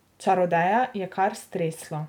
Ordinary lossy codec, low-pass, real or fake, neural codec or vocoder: none; 19.8 kHz; real; none